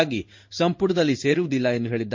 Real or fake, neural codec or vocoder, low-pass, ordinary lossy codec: fake; codec, 16 kHz in and 24 kHz out, 1 kbps, XY-Tokenizer; 7.2 kHz; MP3, 48 kbps